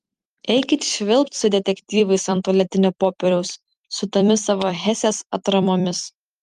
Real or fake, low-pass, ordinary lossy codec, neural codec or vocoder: fake; 14.4 kHz; Opus, 32 kbps; vocoder, 44.1 kHz, 128 mel bands every 256 samples, BigVGAN v2